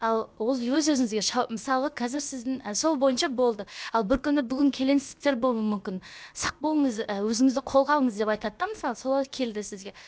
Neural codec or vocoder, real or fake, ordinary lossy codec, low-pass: codec, 16 kHz, about 1 kbps, DyCAST, with the encoder's durations; fake; none; none